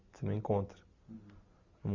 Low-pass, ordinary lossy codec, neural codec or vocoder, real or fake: 7.2 kHz; none; none; real